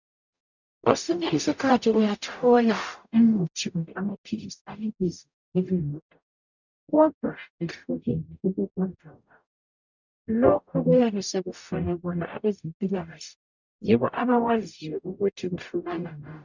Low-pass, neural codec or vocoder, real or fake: 7.2 kHz; codec, 44.1 kHz, 0.9 kbps, DAC; fake